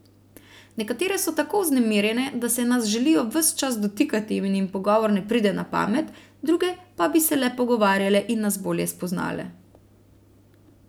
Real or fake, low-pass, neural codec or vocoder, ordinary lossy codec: real; none; none; none